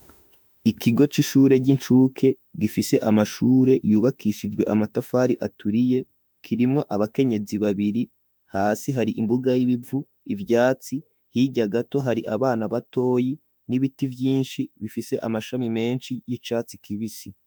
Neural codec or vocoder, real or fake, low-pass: autoencoder, 48 kHz, 32 numbers a frame, DAC-VAE, trained on Japanese speech; fake; 19.8 kHz